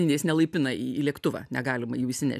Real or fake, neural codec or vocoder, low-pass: real; none; 14.4 kHz